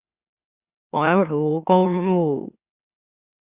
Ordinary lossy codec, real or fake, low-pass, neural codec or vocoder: Opus, 64 kbps; fake; 3.6 kHz; autoencoder, 44.1 kHz, a latent of 192 numbers a frame, MeloTTS